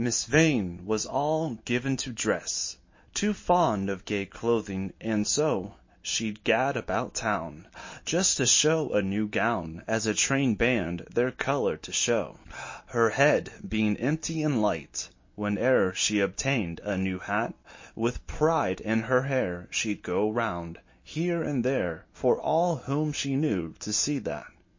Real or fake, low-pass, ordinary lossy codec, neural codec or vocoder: real; 7.2 kHz; MP3, 32 kbps; none